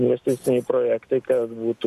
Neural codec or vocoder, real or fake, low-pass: none; real; 14.4 kHz